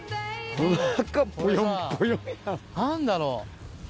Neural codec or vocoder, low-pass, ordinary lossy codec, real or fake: none; none; none; real